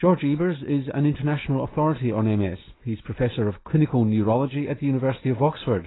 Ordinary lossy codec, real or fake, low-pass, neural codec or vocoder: AAC, 16 kbps; real; 7.2 kHz; none